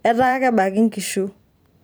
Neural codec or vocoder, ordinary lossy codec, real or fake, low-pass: vocoder, 44.1 kHz, 128 mel bands every 256 samples, BigVGAN v2; none; fake; none